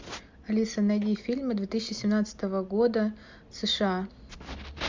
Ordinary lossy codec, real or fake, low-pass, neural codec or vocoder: MP3, 64 kbps; real; 7.2 kHz; none